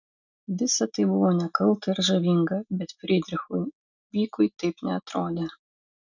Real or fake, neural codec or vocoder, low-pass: real; none; 7.2 kHz